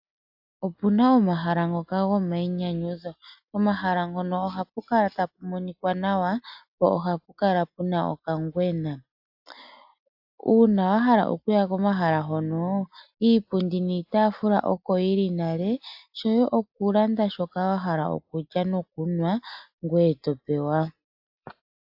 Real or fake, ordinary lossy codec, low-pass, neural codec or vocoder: real; AAC, 48 kbps; 5.4 kHz; none